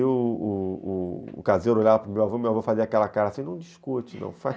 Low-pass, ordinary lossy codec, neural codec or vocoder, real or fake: none; none; none; real